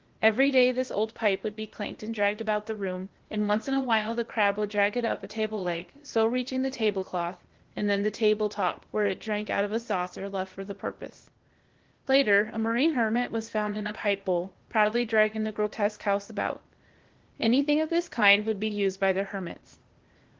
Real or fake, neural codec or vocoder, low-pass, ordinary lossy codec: fake; codec, 16 kHz, 0.8 kbps, ZipCodec; 7.2 kHz; Opus, 16 kbps